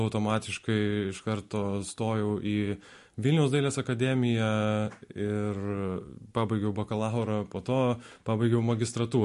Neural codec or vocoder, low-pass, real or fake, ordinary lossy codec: none; 14.4 kHz; real; MP3, 48 kbps